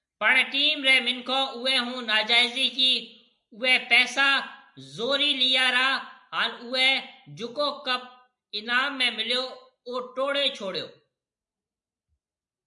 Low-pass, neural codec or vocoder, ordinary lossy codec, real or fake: 10.8 kHz; none; MP3, 96 kbps; real